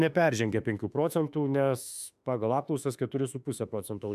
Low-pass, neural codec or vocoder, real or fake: 14.4 kHz; autoencoder, 48 kHz, 32 numbers a frame, DAC-VAE, trained on Japanese speech; fake